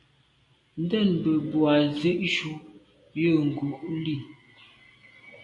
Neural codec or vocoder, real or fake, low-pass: none; real; 10.8 kHz